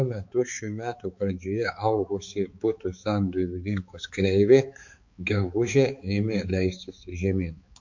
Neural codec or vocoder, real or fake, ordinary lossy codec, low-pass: codec, 16 kHz, 4 kbps, X-Codec, HuBERT features, trained on balanced general audio; fake; MP3, 48 kbps; 7.2 kHz